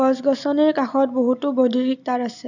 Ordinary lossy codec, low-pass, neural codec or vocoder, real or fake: none; 7.2 kHz; none; real